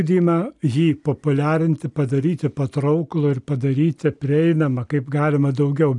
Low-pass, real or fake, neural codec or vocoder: 10.8 kHz; real; none